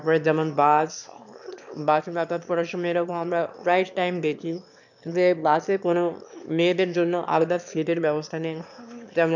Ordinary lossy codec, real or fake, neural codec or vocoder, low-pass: none; fake; autoencoder, 22.05 kHz, a latent of 192 numbers a frame, VITS, trained on one speaker; 7.2 kHz